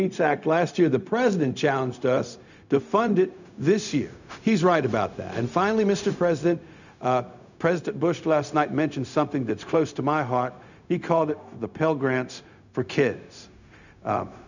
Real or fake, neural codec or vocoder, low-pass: fake; codec, 16 kHz, 0.4 kbps, LongCat-Audio-Codec; 7.2 kHz